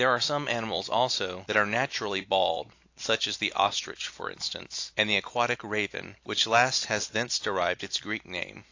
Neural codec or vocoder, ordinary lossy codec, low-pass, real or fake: none; AAC, 48 kbps; 7.2 kHz; real